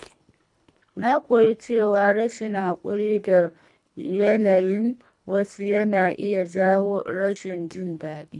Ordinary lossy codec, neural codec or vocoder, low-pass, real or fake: none; codec, 24 kHz, 1.5 kbps, HILCodec; 10.8 kHz; fake